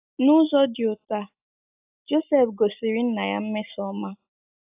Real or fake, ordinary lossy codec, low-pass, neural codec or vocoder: real; AAC, 32 kbps; 3.6 kHz; none